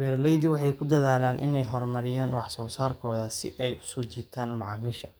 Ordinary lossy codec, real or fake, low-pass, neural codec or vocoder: none; fake; none; codec, 44.1 kHz, 2.6 kbps, SNAC